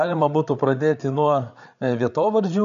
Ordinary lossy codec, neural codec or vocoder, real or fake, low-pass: MP3, 64 kbps; codec, 16 kHz, 8 kbps, FreqCodec, larger model; fake; 7.2 kHz